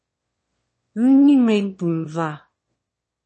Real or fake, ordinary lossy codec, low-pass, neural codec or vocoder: fake; MP3, 32 kbps; 9.9 kHz; autoencoder, 22.05 kHz, a latent of 192 numbers a frame, VITS, trained on one speaker